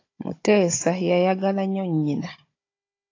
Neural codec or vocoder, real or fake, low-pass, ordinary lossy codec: codec, 16 kHz, 16 kbps, FunCodec, trained on Chinese and English, 50 frames a second; fake; 7.2 kHz; AAC, 32 kbps